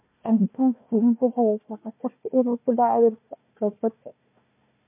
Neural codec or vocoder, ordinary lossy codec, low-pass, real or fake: codec, 16 kHz, 1 kbps, FunCodec, trained on Chinese and English, 50 frames a second; MP3, 32 kbps; 3.6 kHz; fake